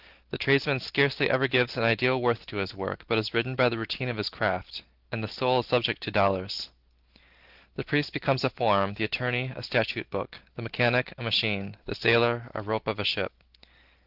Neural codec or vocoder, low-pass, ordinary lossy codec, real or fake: none; 5.4 kHz; Opus, 16 kbps; real